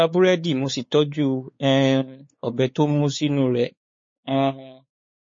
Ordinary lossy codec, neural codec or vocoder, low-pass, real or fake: MP3, 32 kbps; codec, 16 kHz, 4 kbps, X-Codec, WavLM features, trained on Multilingual LibriSpeech; 7.2 kHz; fake